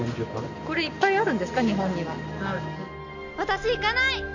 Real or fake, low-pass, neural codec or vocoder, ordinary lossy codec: real; 7.2 kHz; none; none